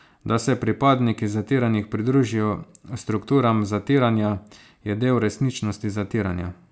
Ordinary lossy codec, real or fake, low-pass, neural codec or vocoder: none; real; none; none